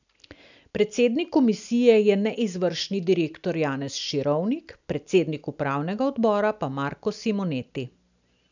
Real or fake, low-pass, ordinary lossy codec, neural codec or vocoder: real; 7.2 kHz; none; none